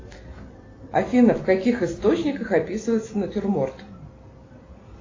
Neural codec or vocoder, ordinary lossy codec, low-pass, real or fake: none; AAC, 32 kbps; 7.2 kHz; real